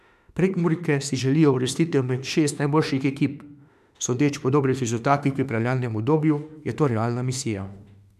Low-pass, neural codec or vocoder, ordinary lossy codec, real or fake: 14.4 kHz; autoencoder, 48 kHz, 32 numbers a frame, DAC-VAE, trained on Japanese speech; none; fake